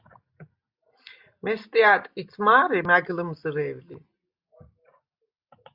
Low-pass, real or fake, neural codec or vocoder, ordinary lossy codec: 5.4 kHz; real; none; Opus, 64 kbps